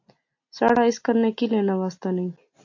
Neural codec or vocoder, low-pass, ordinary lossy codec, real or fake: none; 7.2 kHz; AAC, 48 kbps; real